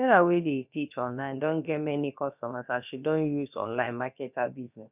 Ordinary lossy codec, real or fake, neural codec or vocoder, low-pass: none; fake; codec, 16 kHz, about 1 kbps, DyCAST, with the encoder's durations; 3.6 kHz